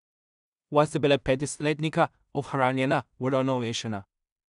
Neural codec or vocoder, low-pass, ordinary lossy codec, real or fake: codec, 16 kHz in and 24 kHz out, 0.4 kbps, LongCat-Audio-Codec, two codebook decoder; 10.8 kHz; none; fake